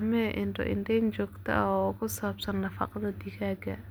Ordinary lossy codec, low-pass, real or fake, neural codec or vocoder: none; none; real; none